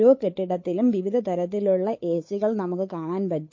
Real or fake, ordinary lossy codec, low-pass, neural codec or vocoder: fake; MP3, 32 kbps; 7.2 kHz; codec, 16 kHz, 8 kbps, FunCodec, trained on Chinese and English, 25 frames a second